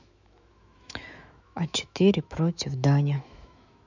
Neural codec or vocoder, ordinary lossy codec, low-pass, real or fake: autoencoder, 48 kHz, 128 numbers a frame, DAC-VAE, trained on Japanese speech; none; 7.2 kHz; fake